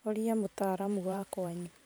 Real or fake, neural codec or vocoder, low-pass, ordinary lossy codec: fake; vocoder, 44.1 kHz, 128 mel bands every 512 samples, BigVGAN v2; none; none